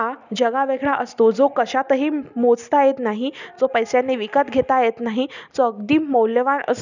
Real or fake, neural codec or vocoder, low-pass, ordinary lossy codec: real; none; 7.2 kHz; none